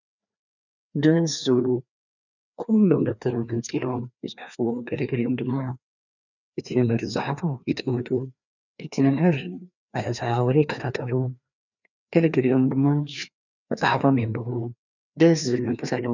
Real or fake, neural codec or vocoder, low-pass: fake; codec, 16 kHz, 2 kbps, FreqCodec, larger model; 7.2 kHz